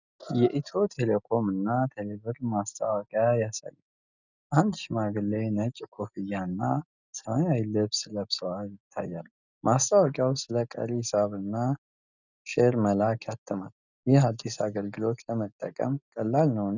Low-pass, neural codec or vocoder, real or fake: 7.2 kHz; none; real